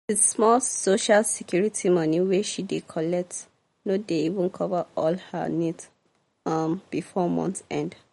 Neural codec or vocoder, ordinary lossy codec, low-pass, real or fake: none; MP3, 48 kbps; 19.8 kHz; real